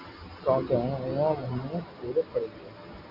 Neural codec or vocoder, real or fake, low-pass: none; real; 5.4 kHz